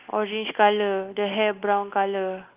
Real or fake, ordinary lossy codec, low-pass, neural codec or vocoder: real; Opus, 24 kbps; 3.6 kHz; none